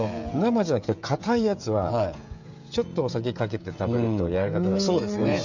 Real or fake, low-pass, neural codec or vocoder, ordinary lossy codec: fake; 7.2 kHz; codec, 16 kHz, 16 kbps, FreqCodec, smaller model; none